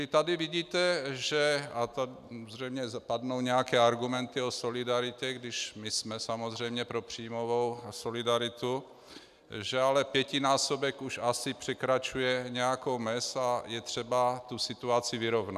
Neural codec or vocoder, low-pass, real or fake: none; 14.4 kHz; real